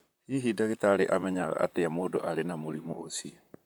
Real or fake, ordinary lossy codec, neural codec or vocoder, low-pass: fake; none; vocoder, 44.1 kHz, 128 mel bands, Pupu-Vocoder; none